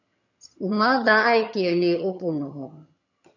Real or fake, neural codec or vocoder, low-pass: fake; vocoder, 22.05 kHz, 80 mel bands, HiFi-GAN; 7.2 kHz